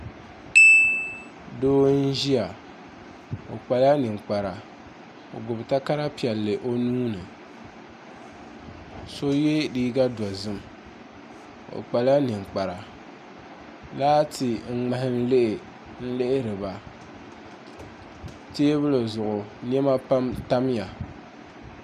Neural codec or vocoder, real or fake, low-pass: none; real; 14.4 kHz